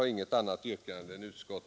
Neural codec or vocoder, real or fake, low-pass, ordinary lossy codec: none; real; none; none